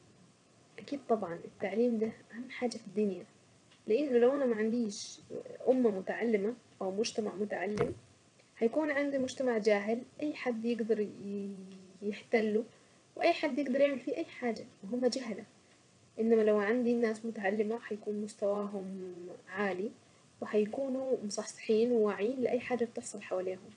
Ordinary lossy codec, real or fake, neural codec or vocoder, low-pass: none; fake; vocoder, 22.05 kHz, 80 mel bands, WaveNeXt; 9.9 kHz